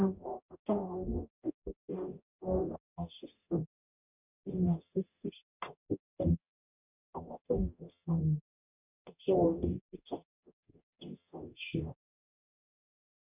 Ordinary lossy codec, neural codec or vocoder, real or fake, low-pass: none; codec, 44.1 kHz, 0.9 kbps, DAC; fake; 3.6 kHz